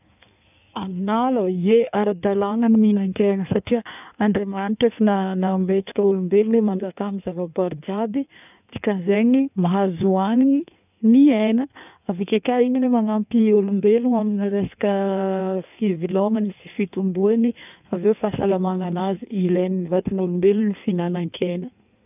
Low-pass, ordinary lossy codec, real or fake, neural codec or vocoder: 3.6 kHz; none; fake; codec, 16 kHz in and 24 kHz out, 1.1 kbps, FireRedTTS-2 codec